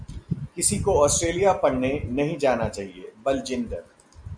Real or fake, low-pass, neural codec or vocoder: real; 9.9 kHz; none